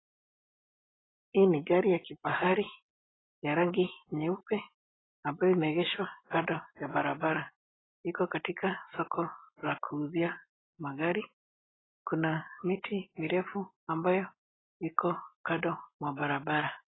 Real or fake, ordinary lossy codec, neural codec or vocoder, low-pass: real; AAC, 16 kbps; none; 7.2 kHz